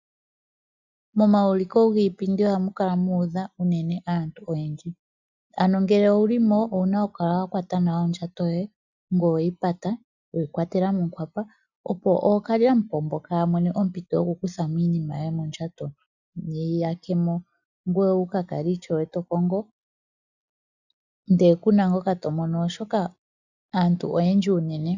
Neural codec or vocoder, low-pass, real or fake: none; 7.2 kHz; real